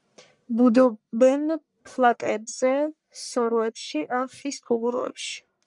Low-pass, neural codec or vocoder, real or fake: 10.8 kHz; codec, 44.1 kHz, 1.7 kbps, Pupu-Codec; fake